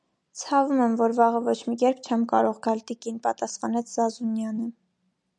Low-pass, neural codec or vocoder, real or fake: 10.8 kHz; none; real